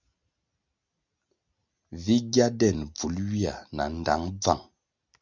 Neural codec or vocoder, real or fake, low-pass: none; real; 7.2 kHz